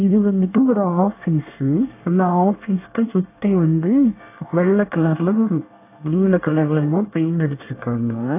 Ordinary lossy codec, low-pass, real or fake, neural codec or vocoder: AAC, 24 kbps; 3.6 kHz; fake; codec, 24 kHz, 1 kbps, SNAC